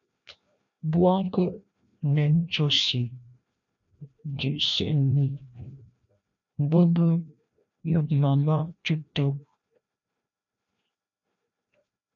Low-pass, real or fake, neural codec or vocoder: 7.2 kHz; fake; codec, 16 kHz, 1 kbps, FreqCodec, larger model